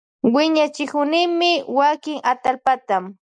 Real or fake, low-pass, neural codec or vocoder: real; 9.9 kHz; none